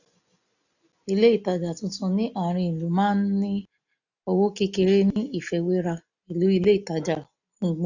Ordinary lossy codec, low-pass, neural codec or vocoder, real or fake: none; 7.2 kHz; none; real